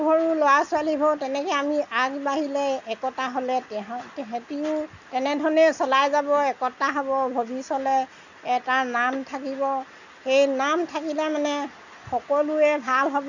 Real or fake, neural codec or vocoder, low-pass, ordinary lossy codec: real; none; 7.2 kHz; none